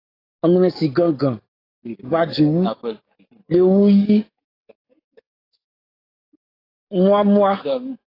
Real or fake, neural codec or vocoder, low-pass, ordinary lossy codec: fake; codec, 44.1 kHz, 7.8 kbps, DAC; 5.4 kHz; AAC, 24 kbps